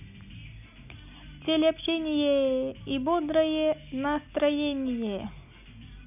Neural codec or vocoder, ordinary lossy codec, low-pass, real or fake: none; none; 3.6 kHz; real